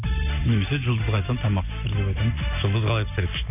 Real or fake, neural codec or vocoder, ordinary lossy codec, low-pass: real; none; none; 3.6 kHz